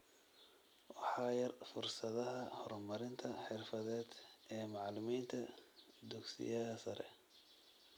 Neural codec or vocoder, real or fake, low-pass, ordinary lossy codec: none; real; none; none